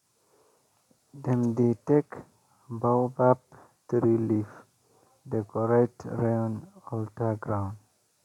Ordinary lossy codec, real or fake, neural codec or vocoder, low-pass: none; real; none; 19.8 kHz